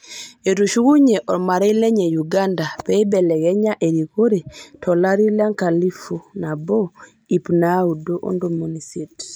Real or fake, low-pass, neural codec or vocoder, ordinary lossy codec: real; none; none; none